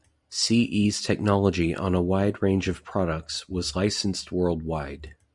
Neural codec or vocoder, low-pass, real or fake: none; 10.8 kHz; real